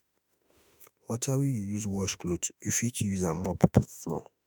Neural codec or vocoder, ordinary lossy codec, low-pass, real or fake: autoencoder, 48 kHz, 32 numbers a frame, DAC-VAE, trained on Japanese speech; none; none; fake